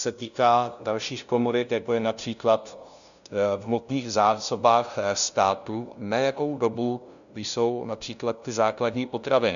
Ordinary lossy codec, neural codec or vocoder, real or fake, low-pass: MP3, 96 kbps; codec, 16 kHz, 0.5 kbps, FunCodec, trained on LibriTTS, 25 frames a second; fake; 7.2 kHz